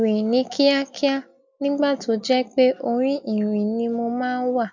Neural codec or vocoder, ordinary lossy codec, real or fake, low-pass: none; none; real; 7.2 kHz